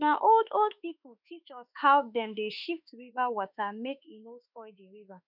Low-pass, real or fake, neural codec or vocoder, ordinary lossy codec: 5.4 kHz; fake; autoencoder, 48 kHz, 32 numbers a frame, DAC-VAE, trained on Japanese speech; none